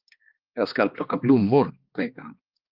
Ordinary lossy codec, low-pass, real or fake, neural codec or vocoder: Opus, 24 kbps; 5.4 kHz; fake; codec, 16 kHz, 2 kbps, X-Codec, HuBERT features, trained on balanced general audio